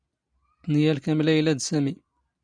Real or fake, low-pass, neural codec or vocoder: real; 9.9 kHz; none